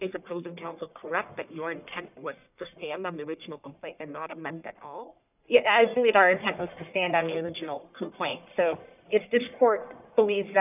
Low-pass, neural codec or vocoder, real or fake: 3.6 kHz; codec, 44.1 kHz, 1.7 kbps, Pupu-Codec; fake